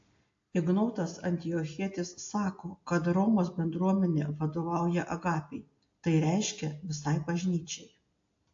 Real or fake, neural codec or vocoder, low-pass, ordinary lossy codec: real; none; 7.2 kHz; AAC, 48 kbps